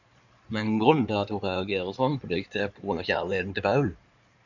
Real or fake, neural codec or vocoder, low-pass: fake; codec, 16 kHz in and 24 kHz out, 2.2 kbps, FireRedTTS-2 codec; 7.2 kHz